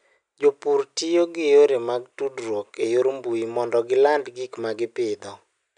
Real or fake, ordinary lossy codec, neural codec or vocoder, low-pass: real; none; none; 9.9 kHz